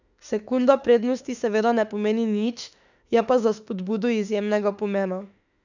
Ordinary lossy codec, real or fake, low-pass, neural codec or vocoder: none; fake; 7.2 kHz; autoencoder, 48 kHz, 32 numbers a frame, DAC-VAE, trained on Japanese speech